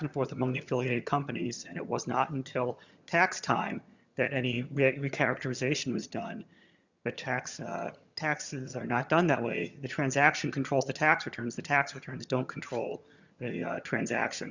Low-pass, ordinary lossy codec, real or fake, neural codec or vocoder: 7.2 kHz; Opus, 64 kbps; fake; vocoder, 22.05 kHz, 80 mel bands, HiFi-GAN